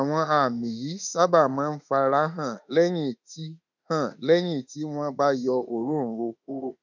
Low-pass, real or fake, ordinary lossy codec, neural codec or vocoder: 7.2 kHz; fake; none; autoencoder, 48 kHz, 32 numbers a frame, DAC-VAE, trained on Japanese speech